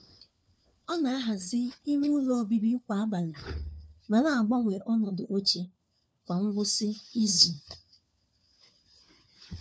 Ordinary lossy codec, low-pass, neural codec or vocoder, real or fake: none; none; codec, 16 kHz, 4 kbps, FunCodec, trained on LibriTTS, 50 frames a second; fake